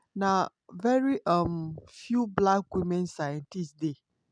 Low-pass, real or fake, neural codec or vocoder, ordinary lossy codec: 9.9 kHz; real; none; none